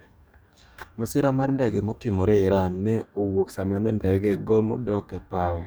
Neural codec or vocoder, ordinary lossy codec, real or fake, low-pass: codec, 44.1 kHz, 2.6 kbps, DAC; none; fake; none